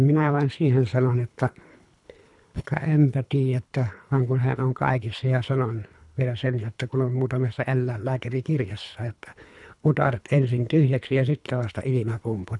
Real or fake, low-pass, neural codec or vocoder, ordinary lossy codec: fake; 10.8 kHz; codec, 24 kHz, 3 kbps, HILCodec; none